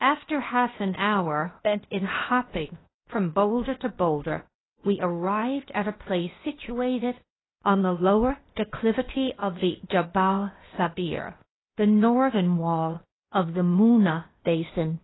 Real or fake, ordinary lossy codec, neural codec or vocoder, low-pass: fake; AAC, 16 kbps; codec, 16 kHz, 0.8 kbps, ZipCodec; 7.2 kHz